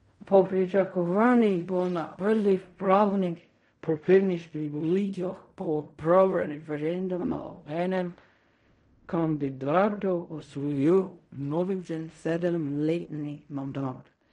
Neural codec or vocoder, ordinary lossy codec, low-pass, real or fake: codec, 16 kHz in and 24 kHz out, 0.4 kbps, LongCat-Audio-Codec, fine tuned four codebook decoder; MP3, 48 kbps; 10.8 kHz; fake